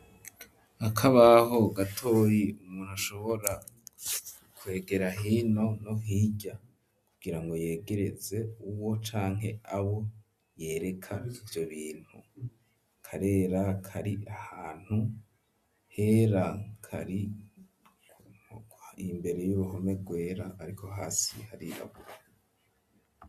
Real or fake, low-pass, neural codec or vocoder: real; 14.4 kHz; none